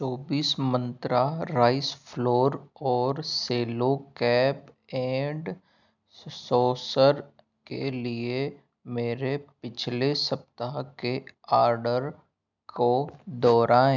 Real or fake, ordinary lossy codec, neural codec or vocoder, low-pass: real; none; none; 7.2 kHz